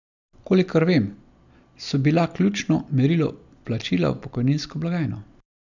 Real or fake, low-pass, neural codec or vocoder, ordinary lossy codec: real; 7.2 kHz; none; none